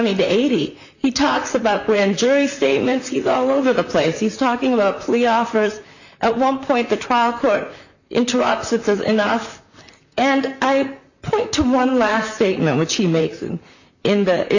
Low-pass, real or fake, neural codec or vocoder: 7.2 kHz; fake; vocoder, 44.1 kHz, 128 mel bands, Pupu-Vocoder